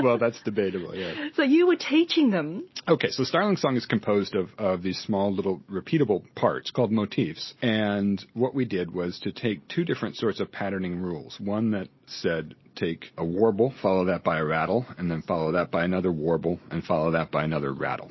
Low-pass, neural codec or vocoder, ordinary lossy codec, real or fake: 7.2 kHz; none; MP3, 24 kbps; real